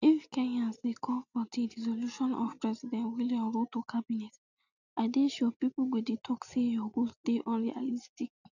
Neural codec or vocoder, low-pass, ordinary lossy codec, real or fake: none; 7.2 kHz; none; real